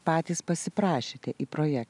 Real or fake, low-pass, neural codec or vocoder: real; 10.8 kHz; none